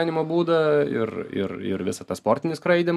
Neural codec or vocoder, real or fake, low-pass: none; real; 14.4 kHz